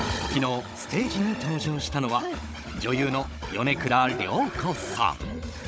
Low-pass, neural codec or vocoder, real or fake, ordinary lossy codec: none; codec, 16 kHz, 16 kbps, FunCodec, trained on Chinese and English, 50 frames a second; fake; none